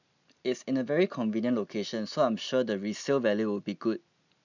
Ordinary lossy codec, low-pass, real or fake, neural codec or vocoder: none; 7.2 kHz; real; none